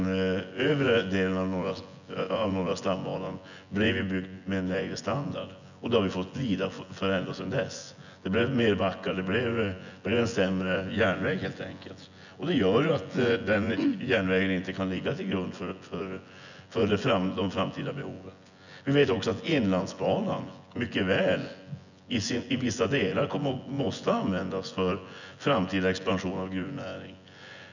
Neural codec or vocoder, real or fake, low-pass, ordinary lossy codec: vocoder, 24 kHz, 100 mel bands, Vocos; fake; 7.2 kHz; none